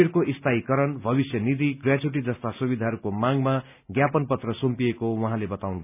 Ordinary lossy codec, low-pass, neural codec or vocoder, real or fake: none; 3.6 kHz; none; real